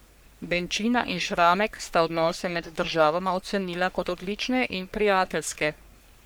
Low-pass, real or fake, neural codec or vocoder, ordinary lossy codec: none; fake; codec, 44.1 kHz, 3.4 kbps, Pupu-Codec; none